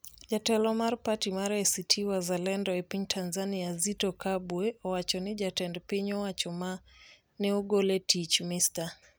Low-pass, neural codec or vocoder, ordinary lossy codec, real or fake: none; none; none; real